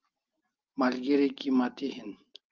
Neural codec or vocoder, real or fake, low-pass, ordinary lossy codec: none; real; 7.2 kHz; Opus, 24 kbps